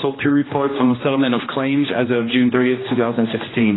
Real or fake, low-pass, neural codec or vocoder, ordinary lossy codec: fake; 7.2 kHz; codec, 16 kHz, 1 kbps, X-Codec, HuBERT features, trained on general audio; AAC, 16 kbps